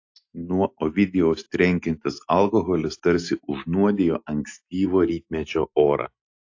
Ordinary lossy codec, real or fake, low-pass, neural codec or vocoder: AAC, 48 kbps; real; 7.2 kHz; none